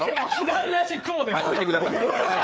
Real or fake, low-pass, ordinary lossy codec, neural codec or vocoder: fake; none; none; codec, 16 kHz, 4 kbps, FreqCodec, larger model